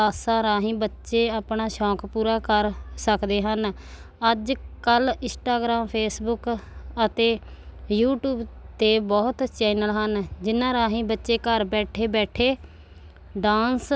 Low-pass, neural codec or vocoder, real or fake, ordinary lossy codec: none; none; real; none